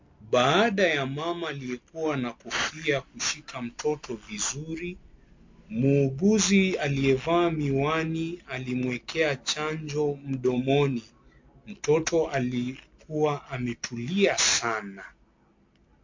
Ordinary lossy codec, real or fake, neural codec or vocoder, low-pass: MP3, 48 kbps; real; none; 7.2 kHz